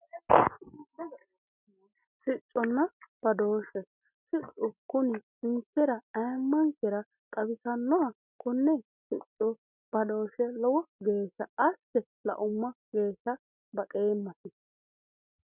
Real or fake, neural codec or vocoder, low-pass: real; none; 3.6 kHz